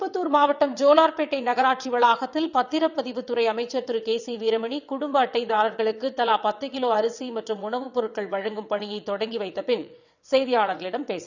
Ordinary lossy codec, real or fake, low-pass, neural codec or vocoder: none; fake; 7.2 kHz; vocoder, 22.05 kHz, 80 mel bands, WaveNeXt